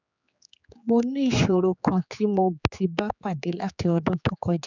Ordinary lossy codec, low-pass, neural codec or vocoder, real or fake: none; 7.2 kHz; codec, 16 kHz, 4 kbps, X-Codec, HuBERT features, trained on general audio; fake